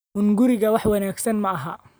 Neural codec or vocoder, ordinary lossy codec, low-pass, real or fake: none; none; none; real